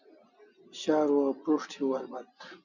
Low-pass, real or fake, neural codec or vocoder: 7.2 kHz; real; none